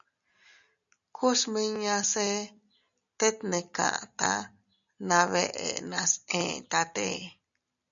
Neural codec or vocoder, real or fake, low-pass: none; real; 7.2 kHz